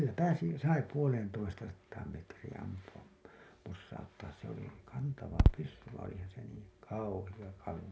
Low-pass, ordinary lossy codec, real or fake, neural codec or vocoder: none; none; real; none